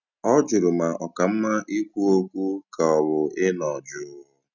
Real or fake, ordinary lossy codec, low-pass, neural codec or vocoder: real; none; 7.2 kHz; none